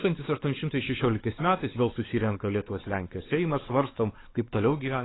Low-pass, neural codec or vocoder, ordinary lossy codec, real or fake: 7.2 kHz; codec, 16 kHz, 4 kbps, X-Codec, WavLM features, trained on Multilingual LibriSpeech; AAC, 16 kbps; fake